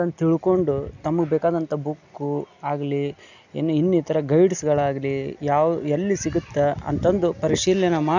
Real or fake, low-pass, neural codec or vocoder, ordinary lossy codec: real; 7.2 kHz; none; none